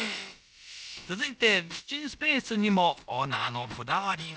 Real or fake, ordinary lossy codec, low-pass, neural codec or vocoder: fake; none; none; codec, 16 kHz, about 1 kbps, DyCAST, with the encoder's durations